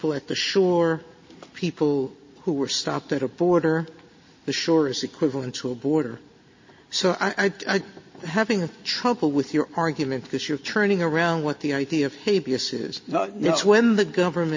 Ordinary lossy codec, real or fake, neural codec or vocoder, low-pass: MP3, 32 kbps; real; none; 7.2 kHz